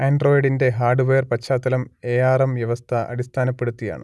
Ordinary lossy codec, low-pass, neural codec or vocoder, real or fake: none; none; none; real